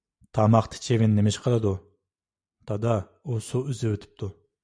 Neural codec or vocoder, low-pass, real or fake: none; 9.9 kHz; real